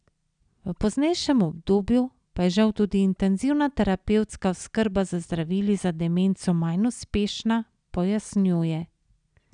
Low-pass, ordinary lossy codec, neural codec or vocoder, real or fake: 9.9 kHz; none; none; real